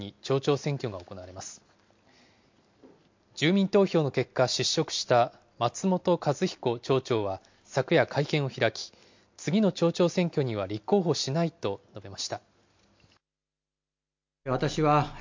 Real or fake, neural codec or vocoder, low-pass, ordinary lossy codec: real; none; 7.2 kHz; MP3, 48 kbps